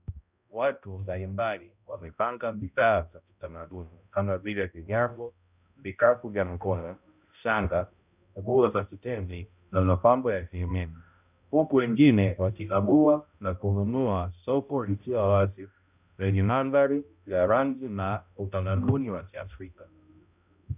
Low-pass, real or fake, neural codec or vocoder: 3.6 kHz; fake; codec, 16 kHz, 0.5 kbps, X-Codec, HuBERT features, trained on balanced general audio